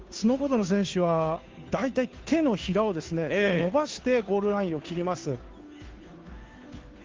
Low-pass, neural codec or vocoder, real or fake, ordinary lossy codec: 7.2 kHz; codec, 16 kHz, 2 kbps, FunCodec, trained on Chinese and English, 25 frames a second; fake; Opus, 32 kbps